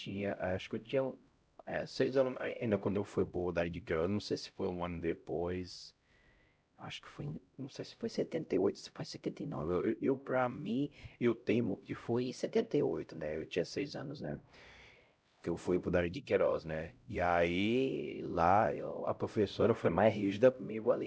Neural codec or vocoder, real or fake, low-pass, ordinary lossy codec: codec, 16 kHz, 0.5 kbps, X-Codec, HuBERT features, trained on LibriSpeech; fake; none; none